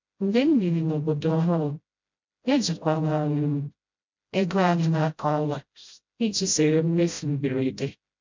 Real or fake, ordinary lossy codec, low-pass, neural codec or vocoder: fake; MP3, 64 kbps; 7.2 kHz; codec, 16 kHz, 0.5 kbps, FreqCodec, smaller model